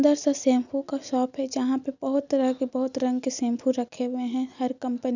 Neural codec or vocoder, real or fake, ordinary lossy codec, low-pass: none; real; none; 7.2 kHz